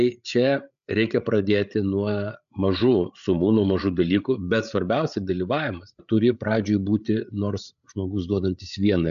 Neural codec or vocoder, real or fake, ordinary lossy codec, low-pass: codec, 16 kHz, 8 kbps, FreqCodec, larger model; fake; AAC, 96 kbps; 7.2 kHz